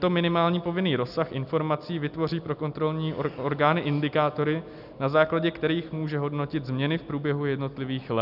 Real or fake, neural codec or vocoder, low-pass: real; none; 5.4 kHz